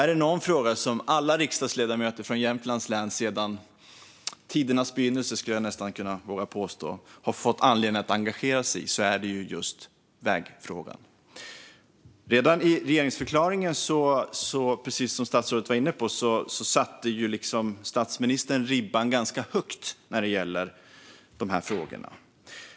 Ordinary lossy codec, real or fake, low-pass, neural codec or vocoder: none; real; none; none